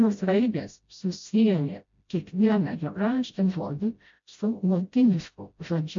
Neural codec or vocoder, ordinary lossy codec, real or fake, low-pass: codec, 16 kHz, 0.5 kbps, FreqCodec, smaller model; MP3, 48 kbps; fake; 7.2 kHz